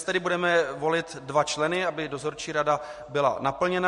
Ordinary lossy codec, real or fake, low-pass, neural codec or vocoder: MP3, 48 kbps; real; 10.8 kHz; none